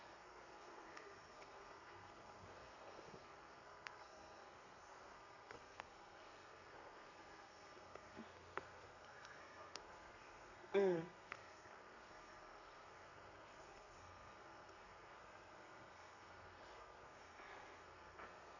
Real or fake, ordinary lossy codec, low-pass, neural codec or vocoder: fake; none; 7.2 kHz; codec, 32 kHz, 1.9 kbps, SNAC